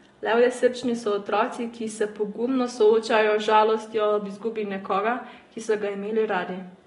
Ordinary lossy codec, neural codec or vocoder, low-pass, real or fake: AAC, 32 kbps; none; 10.8 kHz; real